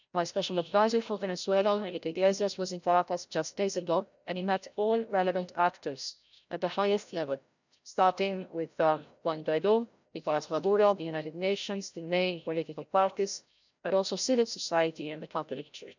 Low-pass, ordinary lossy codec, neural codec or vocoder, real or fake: 7.2 kHz; none; codec, 16 kHz, 0.5 kbps, FreqCodec, larger model; fake